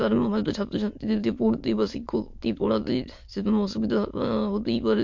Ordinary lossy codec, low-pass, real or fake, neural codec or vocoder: MP3, 48 kbps; 7.2 kHz; fake; autoencoder, 22.05 kHz, a latent of 192 numbers a frame, VITS, trained on many speakers